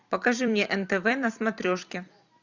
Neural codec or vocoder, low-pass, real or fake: vocoder, 44.1 kHz, 128 mel bands every 256 samples, BigVGAN v2; 7.2 kHz; fake